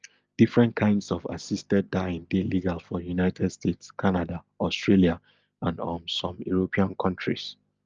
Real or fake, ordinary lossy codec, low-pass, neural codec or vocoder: real; Opus, 24 kbps; 7.2 kHz; none